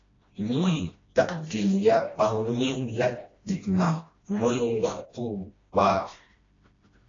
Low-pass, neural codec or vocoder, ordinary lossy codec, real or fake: 7.2 kHz; codec, 16 kHz, 1 kbps, FreqCodec, smaller model; AAC, 32 kbps; fake